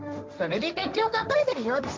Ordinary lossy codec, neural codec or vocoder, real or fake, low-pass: none; codec, 16 kHz, 1.1 kbps, Voila-Tokenizer; fake; none